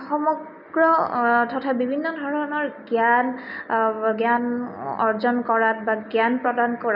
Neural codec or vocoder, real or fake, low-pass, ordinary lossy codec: none; real; 5.4 kHz; none